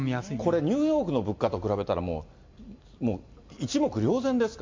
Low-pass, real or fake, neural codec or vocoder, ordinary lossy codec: 7.2 kHz; real; none; MP3, 48 kbps